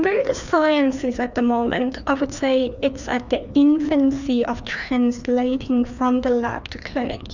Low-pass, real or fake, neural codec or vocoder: 7.2 kHz; fake; codec, 16 kHz, 2 kbps, FreqCodec, larger model